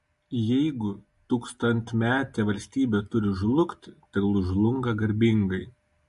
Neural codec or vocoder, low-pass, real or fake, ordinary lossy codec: none; 14.4 kHz; real; MP3, 48 kbps